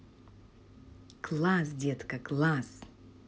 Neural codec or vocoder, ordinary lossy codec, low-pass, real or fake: none; none; none; real